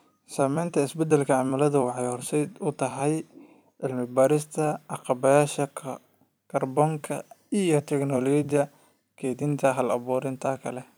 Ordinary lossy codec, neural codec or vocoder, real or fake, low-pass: none; vocoder, 44.1 kHz, 128 mel bands every 256 samples, BigVGAN v2; fake; none